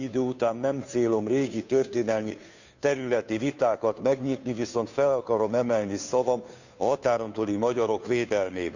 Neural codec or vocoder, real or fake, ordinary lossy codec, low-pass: codec, 16 kHz, 2 kbps, FunCodec, trained on Chinese and English, 25 frames a second; fake; none; 7.2 kHz